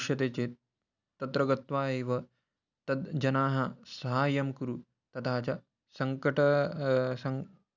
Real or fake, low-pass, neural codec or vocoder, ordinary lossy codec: real; 7.2 kHz; none; none